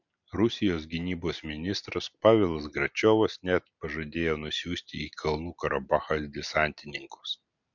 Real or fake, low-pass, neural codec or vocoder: real; 7.2 kHz; none